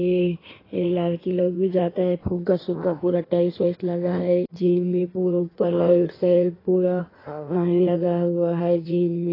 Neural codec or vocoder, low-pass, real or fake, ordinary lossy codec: codec, 16 kHz in and 24 kHz out, 1.1 kbps, FireRedTTS-2 codec; 5.4 kHz; fake; AAC, 24 kbps